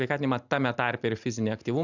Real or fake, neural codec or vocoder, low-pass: real; none; 7.2 kHz